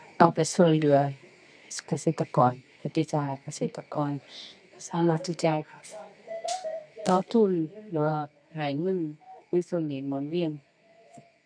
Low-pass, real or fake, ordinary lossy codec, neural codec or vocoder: 9.9 kHz; fake; none; codec, 24 kHz, 0.9 kbps, WavTokenizer, medium music audio release